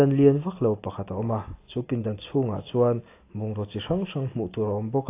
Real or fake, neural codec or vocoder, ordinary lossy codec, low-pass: real; none; AAC, 24 kbps; 3.6 kHz